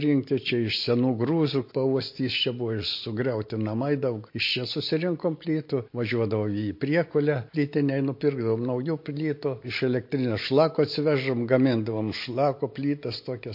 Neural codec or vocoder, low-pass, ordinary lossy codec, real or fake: none; 5.4 kHz; MP3, 32 kbps; real